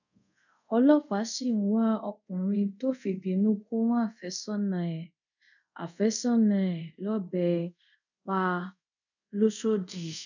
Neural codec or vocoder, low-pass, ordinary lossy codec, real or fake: codec, 24 kHz, 0.5 kbps, DualCodec; 7.2 kHz; none; fake